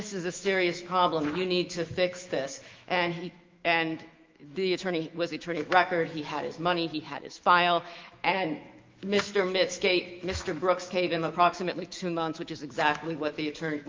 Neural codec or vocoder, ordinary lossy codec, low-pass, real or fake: autoencoder, 48 kHz, 128 numbers a frame, DAC-VAE, trained on Japanese speech; Opus, 32 kbps; 7.2 kHz; fake